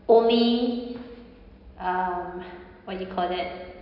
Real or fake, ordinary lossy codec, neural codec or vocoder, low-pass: real; none; none; 5.4 kHz